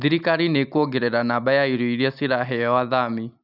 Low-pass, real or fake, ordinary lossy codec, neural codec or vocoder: 5.4 kHz; real; none; none